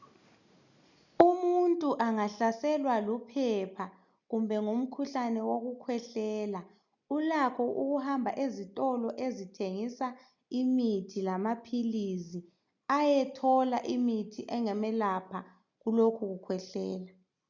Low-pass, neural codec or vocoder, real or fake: 7.2 kHz; none; real